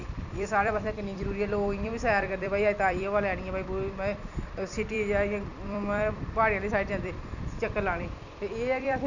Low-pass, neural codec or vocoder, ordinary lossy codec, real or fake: 7.2 kHz; vocoder, 44.1 kHz, 128 mel bands every 256 samples, BigVGAN v2; none; fake